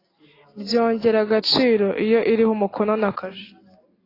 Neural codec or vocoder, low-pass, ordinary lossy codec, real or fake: none; 5.4 kHz; AAC, 24 kbps; real